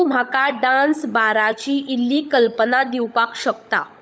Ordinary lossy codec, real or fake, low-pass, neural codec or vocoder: none; fake; none; codec, 16 kHz, 16 kbps, FunCodec, trained on LibriTTS, 50 frames a second